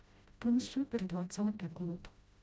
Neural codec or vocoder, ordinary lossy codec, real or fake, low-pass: codec, 16 kHz, 0.5 kbps, FreqCodec, smaller model; none; fake; none